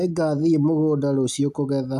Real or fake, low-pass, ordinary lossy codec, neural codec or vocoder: real; 14.4 kHz; none; none